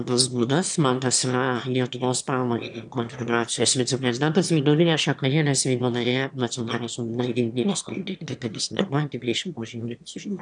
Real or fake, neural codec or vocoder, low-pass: fake; autoencoder, 22.05 kHz, a latent of 192 numbers a frame, VITS, trained on one speaker; 9.9 kHz